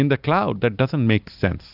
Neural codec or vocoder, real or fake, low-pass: none; real; 5.4 kHz